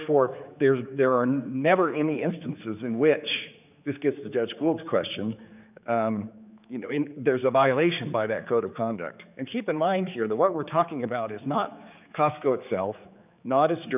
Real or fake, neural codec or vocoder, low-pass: fake; codec, 16 kHz, 4 kbps, X-Codec, HuBERT features, trained on general audio; 3.6 kHz